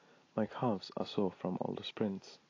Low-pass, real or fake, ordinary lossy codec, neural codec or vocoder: 7.2 kHz; real; AAC, 32 kbps; none